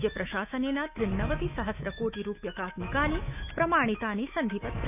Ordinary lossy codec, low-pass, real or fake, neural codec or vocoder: none; 3.6 kHz; fake; autoencoder, 48 kHz, 128 numbers a frame, DAC-VAE, trained on Japanese speech